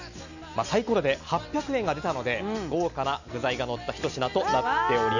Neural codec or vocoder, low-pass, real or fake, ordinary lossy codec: none; 7.2 kHz; real; none